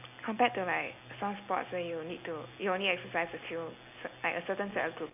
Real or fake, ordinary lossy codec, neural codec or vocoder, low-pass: real; none; none; 3.6 kHz